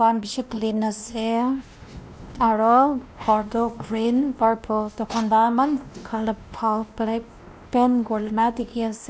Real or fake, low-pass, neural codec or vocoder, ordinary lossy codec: fake; none; codec, 16 kHz, 1 kbps, X-Codec, WavLM features, trained on Multilingual LibriSpeech; none